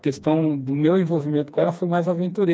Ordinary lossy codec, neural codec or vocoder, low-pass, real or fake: none; codec, 16 kHz, 2 kbps, FreqCodec, smaller model; none; fake